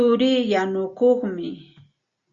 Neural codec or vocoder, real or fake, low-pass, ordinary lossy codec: none; real; 7.2 kHz; Opus, 64 kbps